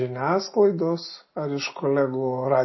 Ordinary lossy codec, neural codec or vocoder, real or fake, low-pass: MP3, 24 kbps; none; real; 7.2 kHz